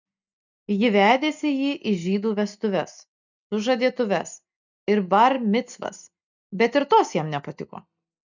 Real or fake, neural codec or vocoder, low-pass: real; none; 7.2 kHz